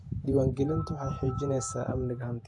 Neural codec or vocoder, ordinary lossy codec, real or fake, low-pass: vocoder, 48 kHz, 128 mel bands, Vocos; AAC, 64 kbps; fake; 10.8 kHz